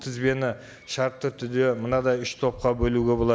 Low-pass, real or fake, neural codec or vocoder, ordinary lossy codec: none; real; none; none